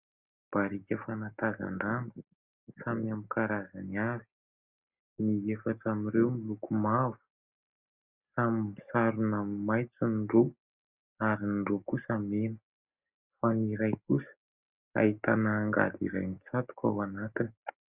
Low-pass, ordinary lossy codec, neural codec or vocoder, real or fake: 3.6 kHz; Opus, 64 kbps; none; real